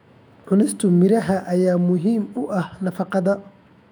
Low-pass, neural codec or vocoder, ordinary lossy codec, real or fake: 19.8 kHz; autoencoder, 48 kHz, 128 numbers a frame, DAC-VAE, trained on Japanese speech; none; fake